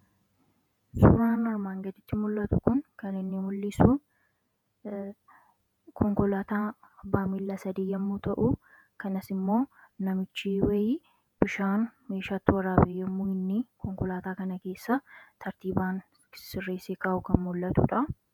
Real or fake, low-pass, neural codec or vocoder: fake; 19.8 kHz; vocoder, 48 kHz, 128 mel bands, Vocos